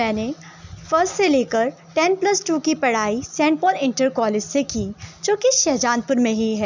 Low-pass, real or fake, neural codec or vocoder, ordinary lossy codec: 7.2 kHz; real; none; none